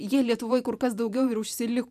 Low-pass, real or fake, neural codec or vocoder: 14.4 kHz; fake; vocoder, 44.1 kHz, 128 mel bands every 512 samples, BigVGAN v2